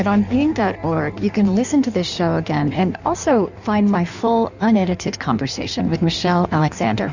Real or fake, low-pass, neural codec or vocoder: fake; 7.2 kHz; codec, 16 kHz in and 24 kHz out, 1.1 kbps, FireRedTTS-2 codec